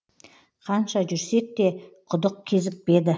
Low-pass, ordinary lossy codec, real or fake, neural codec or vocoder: none; none; real; none